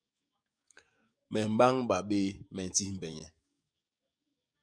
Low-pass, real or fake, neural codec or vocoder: 9.9 kHz; fake; codec, 44.1 kHz, 7.8 kbps, DAC